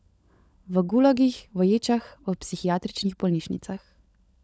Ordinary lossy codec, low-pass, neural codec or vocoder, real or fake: none; none; codec, 16 kHz, 16 kbps, FunCodec, trained on LibriTTS, 50 frames a second; fake